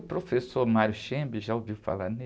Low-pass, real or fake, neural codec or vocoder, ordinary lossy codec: none; real; none; none